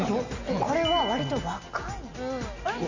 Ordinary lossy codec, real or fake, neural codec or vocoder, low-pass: Opus, 64 kbps; real; none; 7.2 kHz